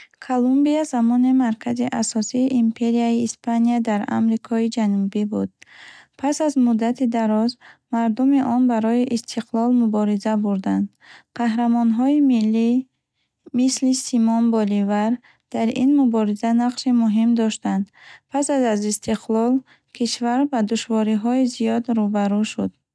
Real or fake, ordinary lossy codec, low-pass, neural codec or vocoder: real; none; none; none